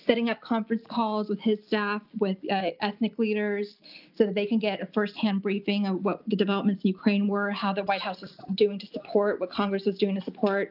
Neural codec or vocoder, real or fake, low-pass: none; real; 5.4 kHz